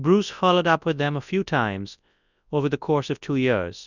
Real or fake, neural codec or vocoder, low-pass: fake; codec, 24 kHz, 0.9 kbps, WavTokenizer, large speech release; 7.2 kHz